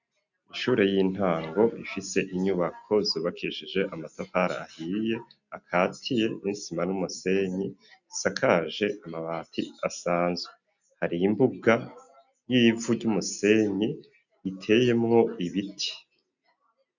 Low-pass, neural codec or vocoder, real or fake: 7.2 kHz; none; real